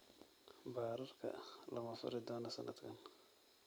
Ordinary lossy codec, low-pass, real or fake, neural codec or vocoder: none; none; real; none